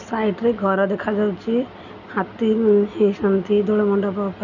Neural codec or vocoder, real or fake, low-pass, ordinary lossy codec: none; real; 7.2 kHz; none